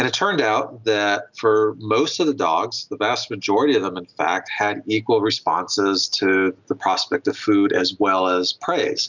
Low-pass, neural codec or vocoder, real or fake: 7.2 kHz; none; real